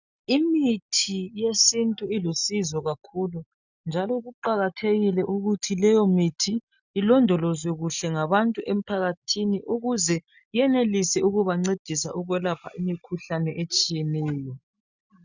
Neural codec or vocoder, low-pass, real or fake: none; 7.2 kHz; real